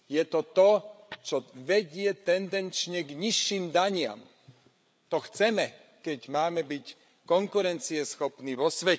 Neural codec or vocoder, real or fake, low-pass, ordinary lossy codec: codec, 16 kHz, 8 kbps, FreqCodec, larger model; fake; none; none